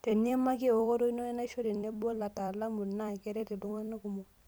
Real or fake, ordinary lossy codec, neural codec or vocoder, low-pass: fake; none; vocoder, 44.1 kHz, 128 mel bands every 256 samples, BigVGAN v2; none